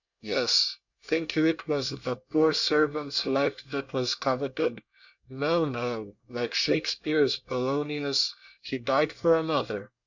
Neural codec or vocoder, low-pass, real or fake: codec, 24 kHz, 1 kbps, SNAC; 7.2 kHz; fake